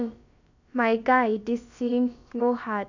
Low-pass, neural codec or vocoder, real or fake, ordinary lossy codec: 7.2 kHz; codec, 16 kHz, about 1 kbps, DyCAST, with the encoder's durations; fake; none